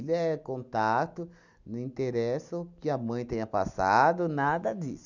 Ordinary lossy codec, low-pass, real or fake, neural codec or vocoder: none; 7.2 kHz; real; none